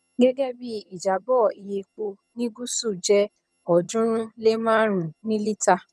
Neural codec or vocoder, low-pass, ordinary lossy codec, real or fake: vocoder, 22.05 kHz, 80 mel bands, HiFi-GAN; none; none; fake